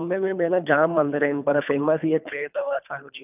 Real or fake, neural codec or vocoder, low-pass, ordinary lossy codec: fake; codec, 24 kHz, 3 kbps, HILCodec; 3.6 kHz; none